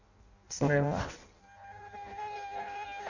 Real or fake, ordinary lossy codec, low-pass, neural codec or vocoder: fake; none; 7.2 kHz; codec, 16 kHz in and 24 kHz out, 0.6 kbps, FireRedTTS-2 codec